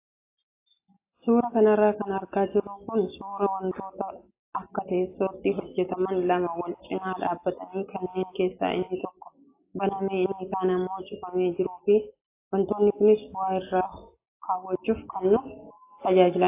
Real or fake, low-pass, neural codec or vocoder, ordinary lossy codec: real; 3.6 kHz; none; AAC, 24 kbps